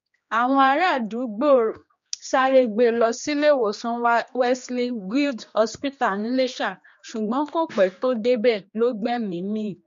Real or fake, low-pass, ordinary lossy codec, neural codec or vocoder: fake; 7.2 kHz; MP3, 48 kbps; codec, 16 kHz, 2 kbps, X-Codec, HuBERT features, trained on general audio